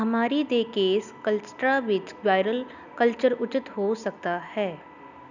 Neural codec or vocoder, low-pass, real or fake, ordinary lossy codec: none; 7.2 kHz; real; none